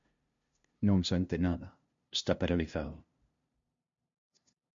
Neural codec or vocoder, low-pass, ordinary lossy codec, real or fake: codec, 16 kHz, 0.5 kbps, FunCodec, trained on LibriTTS, 25 frames a second; 7.2 kHz; MP3, 48 kbps; fake